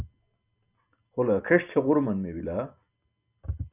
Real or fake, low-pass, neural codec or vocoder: real; 3.6 kHz; none